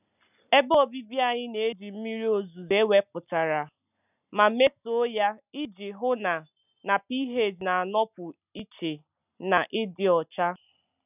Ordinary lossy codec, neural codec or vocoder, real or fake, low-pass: none; none; real; 3.6 kHz